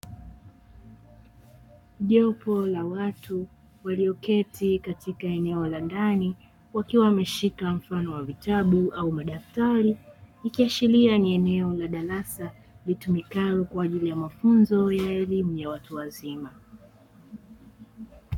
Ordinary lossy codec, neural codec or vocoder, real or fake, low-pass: MP3, 96 kbps; codec, 44.1 kHz, 7.8 kbps, Pupu-Codec; fake; 19.8 kHz